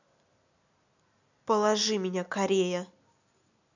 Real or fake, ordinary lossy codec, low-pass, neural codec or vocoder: real; none; 7.2 kHz; none